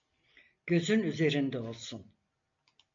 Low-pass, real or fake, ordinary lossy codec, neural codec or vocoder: 7.2 kHz; real; MP3, 48 kbps; none